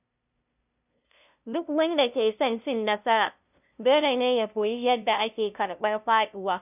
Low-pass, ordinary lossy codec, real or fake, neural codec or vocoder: 3.6 kHz; none; fake; codec, 16 kHz, 0.5 kbps, FunCodec, trained on LibriTTS, 25 frames a second